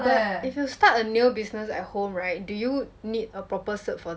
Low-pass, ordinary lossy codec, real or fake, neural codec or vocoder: none; none; real; none